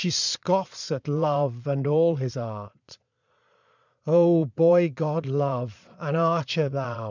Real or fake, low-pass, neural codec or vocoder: fake; 7.2 kHz; vocoder, 22.05 kHz, 80 mel bands, Vocos